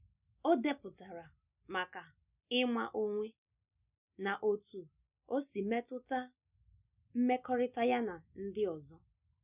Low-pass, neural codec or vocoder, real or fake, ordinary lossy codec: 3.6 kHz; none; real; none